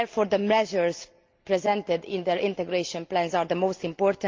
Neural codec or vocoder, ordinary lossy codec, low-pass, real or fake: none; Opus, 32 kbps; 7.2 kHz; real